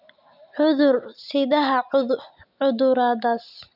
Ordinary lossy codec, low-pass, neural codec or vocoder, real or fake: none; 5.4 kHz; none; real